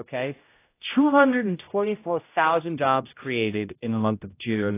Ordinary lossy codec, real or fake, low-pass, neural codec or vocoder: AAC, 24 kbps; fake; 3.6 kHz; codec, 16 kHz, 0.5 kbps, X-Codec, HuBERT features, trained on general audio